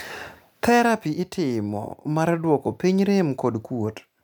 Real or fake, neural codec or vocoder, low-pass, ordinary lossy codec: real; none; none; none